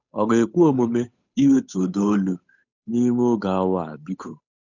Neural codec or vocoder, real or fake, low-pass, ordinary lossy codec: codec, 16 kHz, 8 kbps, FunCodec, trained on Chinese and English, 25 frames a second; fake; 7.2 kHz; none